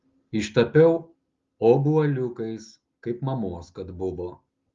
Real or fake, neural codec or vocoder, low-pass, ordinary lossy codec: real; none; 7.2 kHz; Opus, 32 kbps